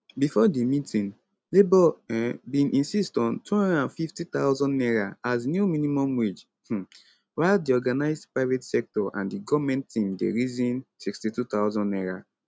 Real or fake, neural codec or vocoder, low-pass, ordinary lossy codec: real; none; none; none